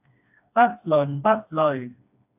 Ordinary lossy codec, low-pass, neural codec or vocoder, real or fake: AAC, 32 kbps; 3.6 kHz; codec, 16 kHz, 2 kbps, FreqCodec, smaller model; fake